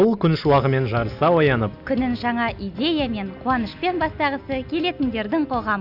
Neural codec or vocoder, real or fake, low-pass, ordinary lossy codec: none; real; 5.4 kHz; none